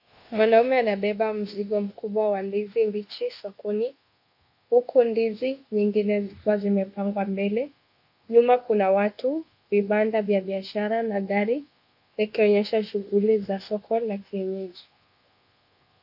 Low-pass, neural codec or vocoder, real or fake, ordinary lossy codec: 5.4 kHz; codec, 24 kHz, 1.2 kbps, DualCodec; fake; AAC, 48 kbps